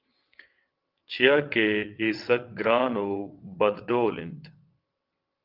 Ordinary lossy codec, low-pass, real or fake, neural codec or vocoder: Opus, 24 kbps; 5.4 kHz; fake; vocoder, 22.05 kHz, 80 mel bands, WaveNeXt